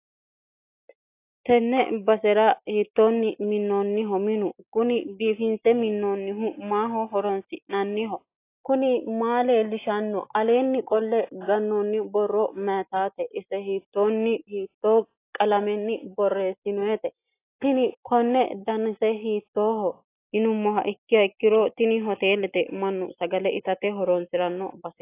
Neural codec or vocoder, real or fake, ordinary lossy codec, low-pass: none; real; AAC, 24 kbps; 3.6 kHz